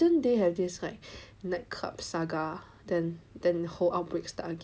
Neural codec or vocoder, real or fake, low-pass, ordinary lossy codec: none; real; none; none